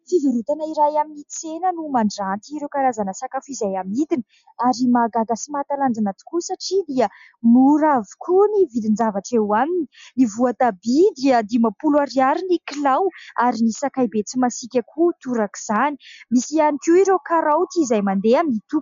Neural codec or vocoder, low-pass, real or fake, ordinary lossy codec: none; 7.2 kHz; real; MP3, 64 kbps